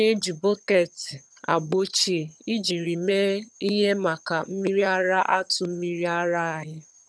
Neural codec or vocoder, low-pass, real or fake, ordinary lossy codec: vocoder, 22.05 kHz, 80 mel bands, HiFi-GAN; none; fake; none